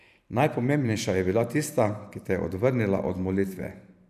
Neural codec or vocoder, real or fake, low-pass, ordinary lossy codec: vocoder, 44.1 kHz, 128 mel bands every 512 samples, BigVGAN v2; fake; 14.4 kHz; none